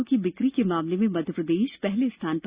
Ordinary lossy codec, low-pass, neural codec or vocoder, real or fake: Opus, 64 kbps; 3.6 kHz; none; real